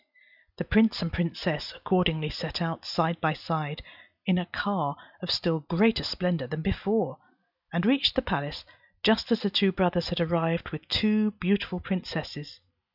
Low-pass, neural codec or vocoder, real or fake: 5.4 kHz; none; real